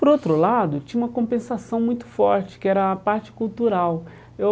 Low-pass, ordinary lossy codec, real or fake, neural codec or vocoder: none; none; real; none